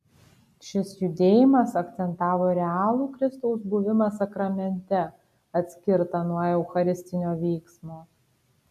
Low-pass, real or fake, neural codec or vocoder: 14.4 kHz; real; none